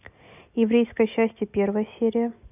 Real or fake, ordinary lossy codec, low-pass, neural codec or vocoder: real; none; 3.6 kHz; none